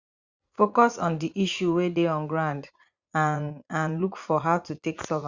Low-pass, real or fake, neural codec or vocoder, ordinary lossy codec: 7.2 kHz; fake; vocoder, 44.1 kHz, 80 mel bands, Vocos; Opus, 64 kbps